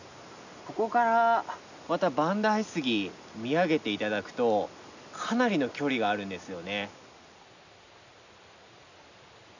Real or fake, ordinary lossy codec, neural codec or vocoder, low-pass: real; none; none; 7.2 kHz